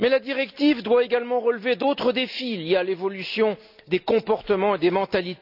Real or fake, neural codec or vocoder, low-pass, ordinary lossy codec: real; none; 5.4 kHz; none